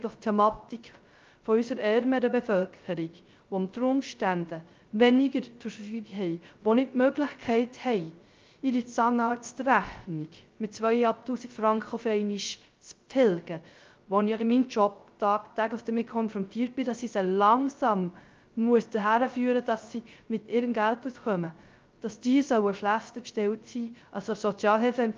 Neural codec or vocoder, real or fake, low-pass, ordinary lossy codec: codec, 16 kHz, 0.3 kbps, FocalCodec; fake; 7.2 kHz; Opus, 24 kbps